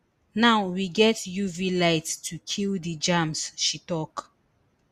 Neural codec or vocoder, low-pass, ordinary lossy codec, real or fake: none; 14.4 kHz; Opus, 64 kbps; real